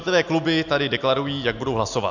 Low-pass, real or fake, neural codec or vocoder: 7.2 kHz; real; none